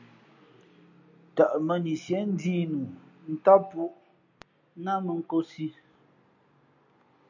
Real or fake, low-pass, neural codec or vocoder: real; 7.2 kHz; none